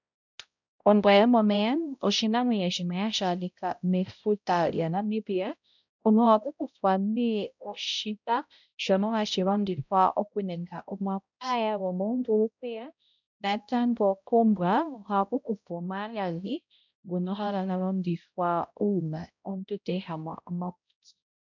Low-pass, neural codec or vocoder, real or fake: 7.2 kHz; codec, 16 kHz, 0.5 kbps, X-Codec, HuBERT features, trained on balanced general audio; fake